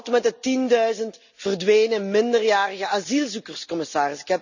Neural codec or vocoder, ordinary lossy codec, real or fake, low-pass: none; none; real; 7.2 kHz